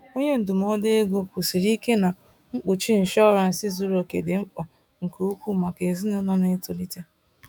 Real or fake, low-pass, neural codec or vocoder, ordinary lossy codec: fake; 19.8 kHz; autoencoder, 48 kHz, 128 numbers a frame, DAC-VAE, trained on Japanese speech; none